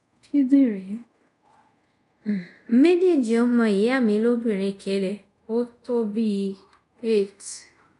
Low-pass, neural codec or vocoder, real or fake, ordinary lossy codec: 10.8 kHz; codec, 24 kHz, 0.5 kbps, DualCodec; fake; none